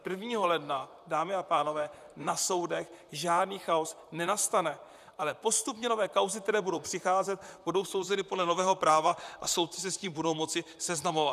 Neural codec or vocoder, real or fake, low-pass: vocoder, 44.1 kHz, 128 mel bands, Pupu-Vocoder; fake; 14.4 kHz